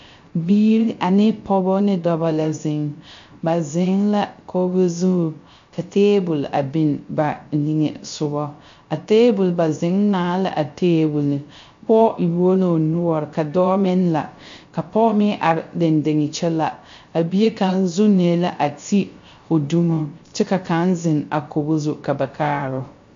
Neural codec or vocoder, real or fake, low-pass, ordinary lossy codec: codec, 16 kHz, 0.3 kbps, FocalCodec; fake; 7.2 kHz; MP3, 48 kbps